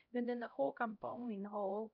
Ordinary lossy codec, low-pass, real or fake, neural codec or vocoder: none; 5.4 kHz; fake; codec, 16 kHz, 0.5 kbps, X-Codec, HuBERT features, trained on LibriSpeech